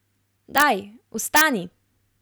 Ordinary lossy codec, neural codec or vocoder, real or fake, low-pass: none; none; real; none